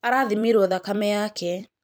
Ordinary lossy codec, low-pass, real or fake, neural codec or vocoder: none; none; fake; vocoder, 44.1 kHz, 128 mel bands every 256 samples, BigVGAN v2